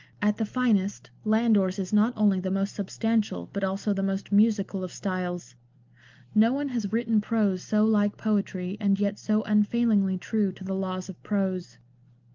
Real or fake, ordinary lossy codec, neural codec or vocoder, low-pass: real; Opus, 32 kbps; none; 7.2 kHz